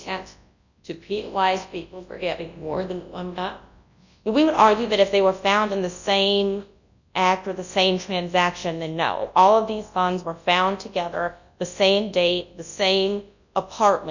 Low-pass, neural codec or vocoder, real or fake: 7.2 kHz; codec, 24 kHz, 0.9 kbps, WavTokenizer, large speech release; fake